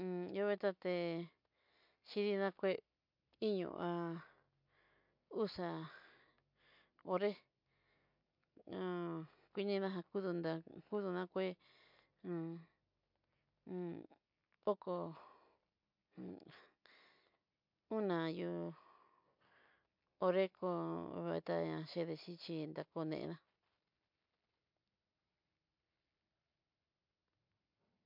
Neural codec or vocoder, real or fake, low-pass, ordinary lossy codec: none; real; 5.4 kHz; MP3, 48 kbps